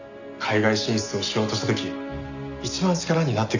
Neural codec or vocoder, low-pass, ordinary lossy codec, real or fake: none; 7.2 kHz; none; real